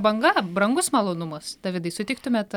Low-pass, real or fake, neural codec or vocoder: 19.8 kHz; real; none